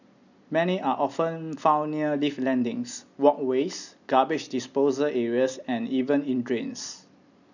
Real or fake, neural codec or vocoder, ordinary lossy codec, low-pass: real; none; none; 7.2 kHz